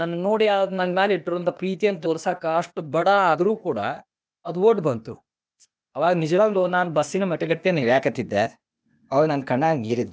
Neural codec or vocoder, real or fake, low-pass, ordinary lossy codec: codec, 16 kHz, 0.8 kbps, ZipCodec; fake; none; none